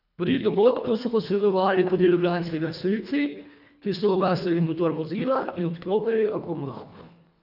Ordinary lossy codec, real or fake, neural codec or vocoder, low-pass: none; fake; codec, 24 kHz, 1.5 kbps, HILCodec; 5.4 kHz